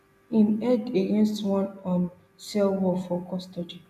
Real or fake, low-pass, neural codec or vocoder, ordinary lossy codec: real; 14.4 kHz; none; none